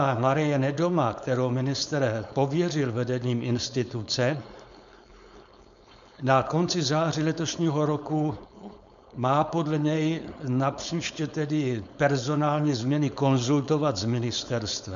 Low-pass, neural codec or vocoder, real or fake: 7.2 kHz; codec, 16 kHz, 4.8 kbps, FACodec; fake